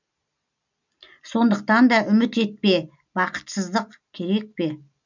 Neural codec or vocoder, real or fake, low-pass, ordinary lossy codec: none; real; 7.2 kHz; none